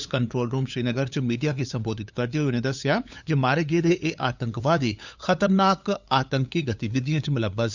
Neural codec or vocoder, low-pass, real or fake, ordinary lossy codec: codec, 16 kHz, 8 kbps, FunCodec, trained on LibriTTS, 25 frames a second; 7.2 kHz; fake; none